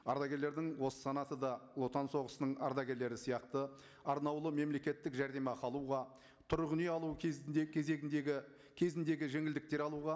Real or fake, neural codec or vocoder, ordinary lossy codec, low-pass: real; none; none; none